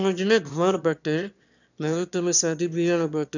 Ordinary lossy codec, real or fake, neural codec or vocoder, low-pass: none; fake; autoencoder, 22.05 kHz, a latent of 192 numbers a frame, VITS, trained on one speaker; 7.2 kHz